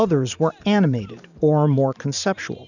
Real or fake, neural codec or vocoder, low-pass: fake; vocoder, 44.1 kHz, 128 mel bands every 512 samples, BigVGAN v2; 7.2 kHz